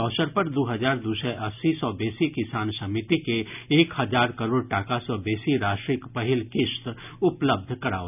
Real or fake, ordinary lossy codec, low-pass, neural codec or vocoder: real; none; 3.6 kHz; none